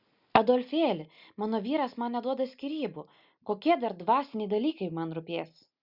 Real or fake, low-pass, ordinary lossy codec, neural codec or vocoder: real; 5.4 kHz; Opus, 64 kbps; none